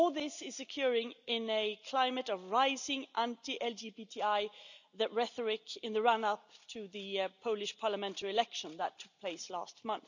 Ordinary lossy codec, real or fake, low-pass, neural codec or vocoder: none; real; 7.2 kHz; none